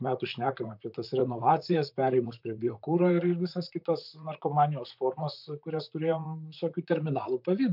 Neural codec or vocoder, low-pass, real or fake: vocoder, 44.1 kHz, 128 mel bands, Pupu-Vocoder; 5.4 kHz; fake